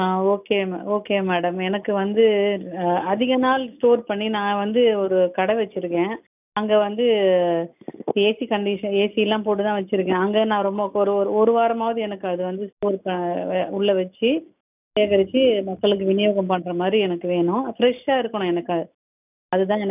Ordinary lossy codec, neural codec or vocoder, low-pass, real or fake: none; none; 3.6 kHz; real